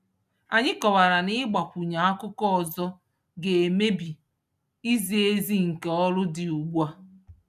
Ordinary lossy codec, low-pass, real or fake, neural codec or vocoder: none; 14.4 kHz; real; none